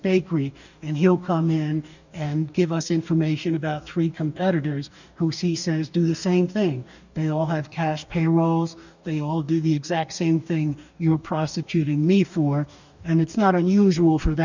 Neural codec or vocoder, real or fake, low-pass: codec, 44.1 kHz, 2.6 kbps, DAC; fake; 7.2 kHz